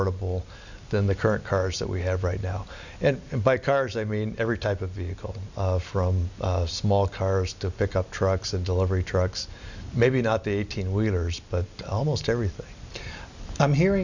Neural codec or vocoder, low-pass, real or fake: none; 7.2 kHz; real